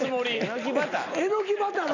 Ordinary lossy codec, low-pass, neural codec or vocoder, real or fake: none; 7.2 kHz; none; real